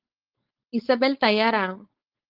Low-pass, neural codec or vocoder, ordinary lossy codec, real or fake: 5.4 kHz; codec, 16 kHz, 4.8 kbps, FACodec; Opus, 24 kbps; fake